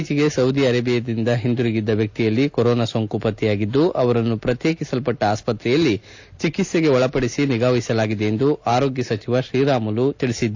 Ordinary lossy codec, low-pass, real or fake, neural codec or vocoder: AAC, 48 kbps; 7.2 kHz; real; none